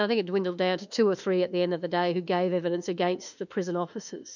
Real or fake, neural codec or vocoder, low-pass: fake; autoencoder, 48 kHz, 32 numbers a frame, DAC-VAE, trained on Japanese speech; 7.2 kHz